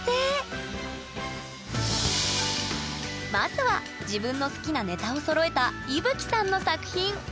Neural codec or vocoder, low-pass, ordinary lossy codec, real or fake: none; none; none; real